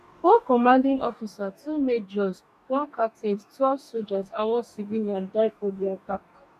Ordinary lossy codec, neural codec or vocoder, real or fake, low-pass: none; codec, 44.1 kHz, 2.6 kbps, DAC; fake; 14.4 kHz